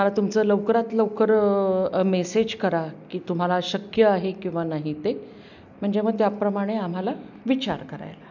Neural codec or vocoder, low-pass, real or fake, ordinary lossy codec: none; 7.2 kHz; real; none